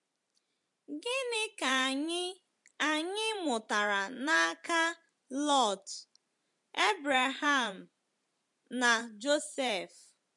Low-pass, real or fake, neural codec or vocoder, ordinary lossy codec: 10.8 kHz; fake; vocoder, 44.1 kHz, 128 mel bands every 512 samples, BigVGAN v2; MP3, 64 kbps